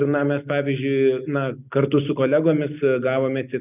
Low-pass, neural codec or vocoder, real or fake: 3.6 kHz; none; real